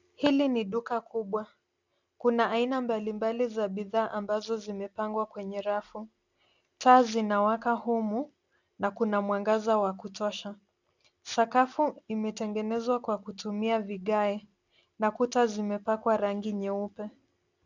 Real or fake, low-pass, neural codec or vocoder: real; 7.2 kHz; none